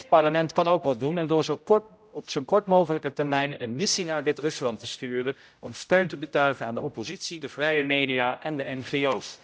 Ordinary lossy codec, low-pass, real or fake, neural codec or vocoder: none; none; fake; codec, 16 kHz, 0.5 kbps, X-Codec, HuBERT features, trained on general audio